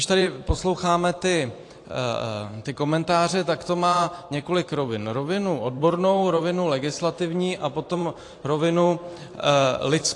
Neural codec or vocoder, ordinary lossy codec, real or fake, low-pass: vocoder, 44.1 kHz, 128 mel bands every 512 samples, BigVGAN v2; AAC, 48 kbps; fake; 10.8 kHz